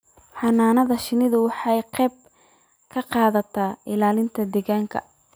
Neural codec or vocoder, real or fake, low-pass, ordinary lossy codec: none; real; none; none